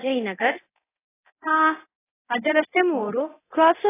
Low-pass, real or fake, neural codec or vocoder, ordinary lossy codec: 3.6 kHz; fake; codec, 24 kHz, 0.9 kbps, DualCodec; AAC, 16 kbps